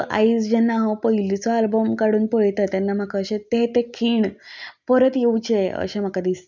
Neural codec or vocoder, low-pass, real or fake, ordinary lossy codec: none; 7.2 kHz; real; none